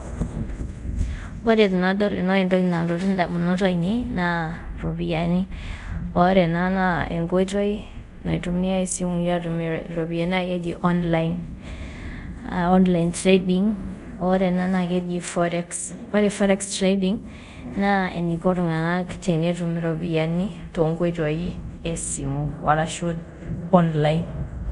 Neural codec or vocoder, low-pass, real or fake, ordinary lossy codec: codec, 24 kHz, 0.5 kbps, DualCodec; 10.8 kHz; fake; none